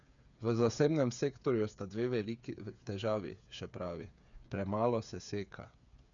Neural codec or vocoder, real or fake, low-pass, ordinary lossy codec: codec, 16 kHz, 8 kbps, FreqCodec, smaller model; fake; 7.2 kHz; none